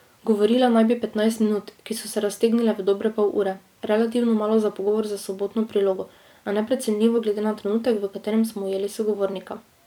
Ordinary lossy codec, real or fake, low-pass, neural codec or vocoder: none; fake; 19.8 kHz; vocoder, 44.1 kHz, 128 mel bands every 256 samples, BigVGAN v2